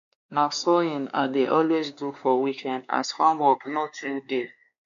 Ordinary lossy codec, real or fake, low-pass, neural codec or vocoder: none; fake; 7.2 kHz; codec, 16 kHz, 2 kbps, X-Codec, WavLM features, trained on Multilingual LibriSpeech